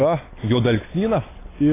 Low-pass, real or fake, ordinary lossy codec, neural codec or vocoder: 3.6 kHz; real; AAC, 16 kbps; none